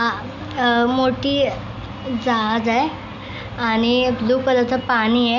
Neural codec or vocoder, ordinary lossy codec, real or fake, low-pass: none; none; real; 7.2 kHz